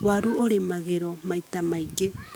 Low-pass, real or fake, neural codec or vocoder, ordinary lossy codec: none; fake; codec, 44.1 kHz, 7.8 kbps, DAC; none